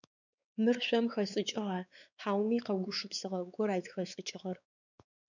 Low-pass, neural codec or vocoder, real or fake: 7.2 kHz; codec, 16 kHz, 4 kbps, X-Codec, WavLM features, trained on Multilingual LibriSpeech; fake